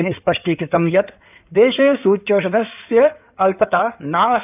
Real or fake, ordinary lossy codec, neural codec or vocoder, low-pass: fake; none; codec, 16 kHz in and 24 kHz out, 2.2 kbps, FireRedTTS-2 codec; 3.6 kHz